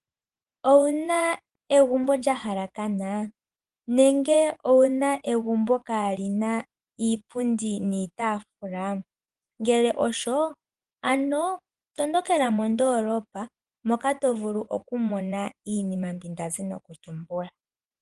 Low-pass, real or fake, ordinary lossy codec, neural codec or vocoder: 10.8 kHz; fake; Opus, 24 kbps; vocoder, 24 kHz, 100 mel bands, Vocos